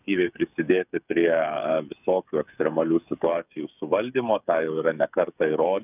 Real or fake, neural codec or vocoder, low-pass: fake; codec, 16 kHz, 8 kbps, FreqCodec, smaller model; 3.6 kHz